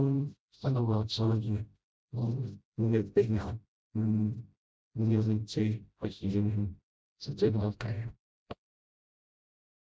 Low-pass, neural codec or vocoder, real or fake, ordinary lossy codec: none; codec, 16 kHz, 0.5 kbps, FreqCodec, smaller model; fake; none